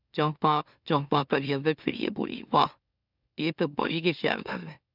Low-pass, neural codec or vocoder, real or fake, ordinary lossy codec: 5.4 kHz; autoencoder, 44.1 kHz, a latent of 192 numbers a frame, MeloTTS; fake; none